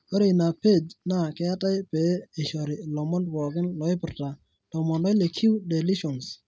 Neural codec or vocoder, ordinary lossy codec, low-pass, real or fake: none; none; none; real